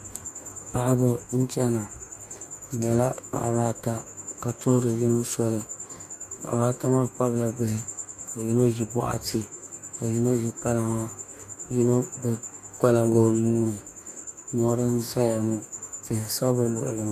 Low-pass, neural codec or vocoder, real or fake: 14.4 kHz; codec, 44.1 kHz, 2.6 kbps, DAC; fake